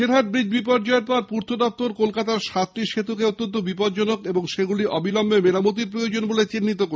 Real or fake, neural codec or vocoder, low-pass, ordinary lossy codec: real; none; none; none